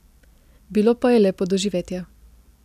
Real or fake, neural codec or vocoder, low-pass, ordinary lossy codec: real; none; 14.4 kHz; none